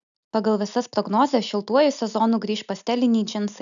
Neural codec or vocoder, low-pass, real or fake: none; 7.2 kHz; real